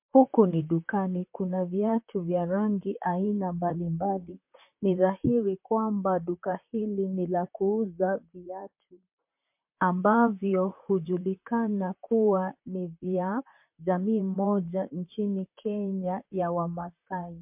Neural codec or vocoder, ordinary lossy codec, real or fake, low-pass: vocoder, 44.1 kHz, 128 mel bands, Pupu-Vocoder; MP3, 32 kbps; fake; 3.6 kHz